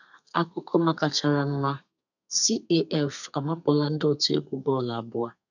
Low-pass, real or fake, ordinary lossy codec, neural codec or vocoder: 7.2 kHz; fake; none; codec, 32 kHz, 1.9 kbps, SNAC